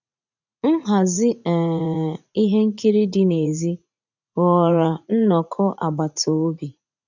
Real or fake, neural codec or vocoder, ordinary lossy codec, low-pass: fake; vocoder, 44.1 kHz, 80 mel bands, Vocos; none; 7.2 kHz